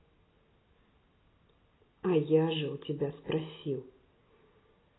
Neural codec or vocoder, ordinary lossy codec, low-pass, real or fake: none; AAC, 16 kbps; 7.2 kHz; real